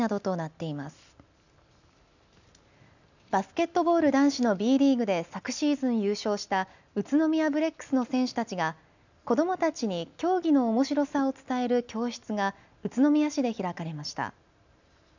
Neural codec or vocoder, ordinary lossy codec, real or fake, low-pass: none; none; real; 7.2 kHz